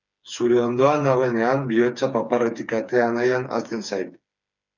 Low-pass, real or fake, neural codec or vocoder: 7.2 kHz; fake; codec, 16 kHz, 4 kbps, FreqCodec, smaller model